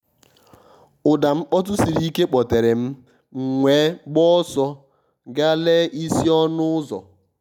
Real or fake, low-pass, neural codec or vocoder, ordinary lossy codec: real; 19.8 kHz; none; none